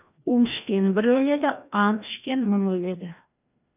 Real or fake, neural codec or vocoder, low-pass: fake; codec, 16 kHz, 1 kbps, FreqCodec, larger model; 3.6 kHz